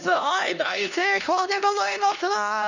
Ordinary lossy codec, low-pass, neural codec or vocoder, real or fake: none; 7.2 kHz; codec, 16 kHz, 1 kbps, X-Codec, HuBERT features, trained on LibriSpeech; fake